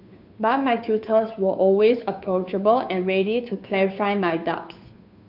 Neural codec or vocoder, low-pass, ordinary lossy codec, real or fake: codec, 16 kHz, 2 kbps, FunCodec, trained on Chinese and English, 25 frames a second; 5.4 kHz; none; fake